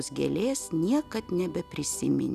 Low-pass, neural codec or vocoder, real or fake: 14.4 kHz; none; real